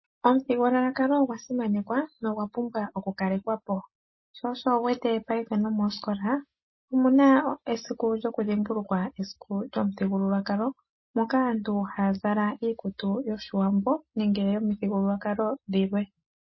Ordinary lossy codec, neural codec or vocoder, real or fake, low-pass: MP3, 24 kbps; none; real; 7.2 kHz